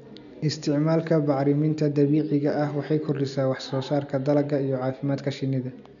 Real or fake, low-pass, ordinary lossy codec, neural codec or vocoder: real; 7.2 kHz; none; none